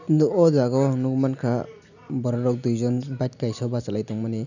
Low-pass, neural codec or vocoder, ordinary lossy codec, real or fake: 7.2 kHz; none; none; real